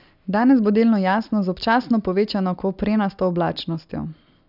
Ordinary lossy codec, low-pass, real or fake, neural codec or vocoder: none; 5.4 kHz; real; none